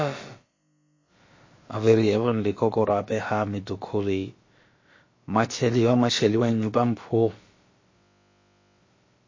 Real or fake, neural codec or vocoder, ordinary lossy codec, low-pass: fake; codec, 16 kHz, about 1 kbps, DyCAST, with the encoder's durations; MP3, 32 kbps; 7.2 kHz